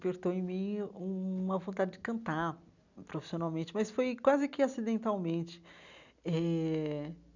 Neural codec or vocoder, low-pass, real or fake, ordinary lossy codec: none; 7.2 kHz; real; none